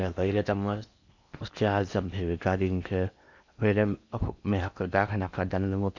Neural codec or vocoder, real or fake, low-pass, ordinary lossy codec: codec, 16 kHz in and 24 kHz out, 0.8 kbps, FocalCodec, streaming, 65536 codes; fake; 7.2 kHz; none